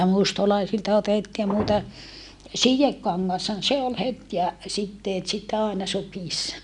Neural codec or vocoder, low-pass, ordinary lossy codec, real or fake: none; 10.8 kHz; none; real